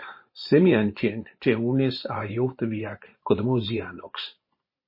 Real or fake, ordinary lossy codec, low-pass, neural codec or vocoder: fake; MP3, 24 kbps; 5.4 kHz; vocoder, 44.1 kHz, 128 mel bands every 512 samples, BigVGAN v2